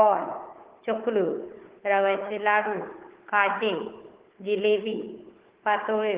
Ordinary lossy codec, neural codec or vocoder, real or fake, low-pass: Opus, 16 kbps; codec, 16 kHz, 4 kbps, FunCodec, trained on Chinese and English, 50 frames a second; fake; 3.6 kHz